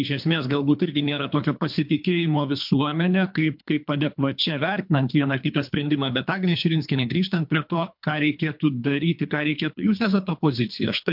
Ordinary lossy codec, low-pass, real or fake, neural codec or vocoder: MP3, 48 kbps; 5.4 kHz; fake; codec, 24 kHz, 3 kbps, HILCodec